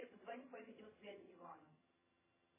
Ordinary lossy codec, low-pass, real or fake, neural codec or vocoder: MP3, 16 kbps; 3.6 kHz; fake; vocoder, 22.05 kHz, 80 mel bands, HiFi-GAN